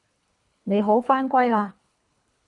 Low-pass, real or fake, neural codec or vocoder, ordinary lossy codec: 10.8 kHz; fake; codec, 24 kHz, 3 kbps, HILCodec; MP3, 96 kbps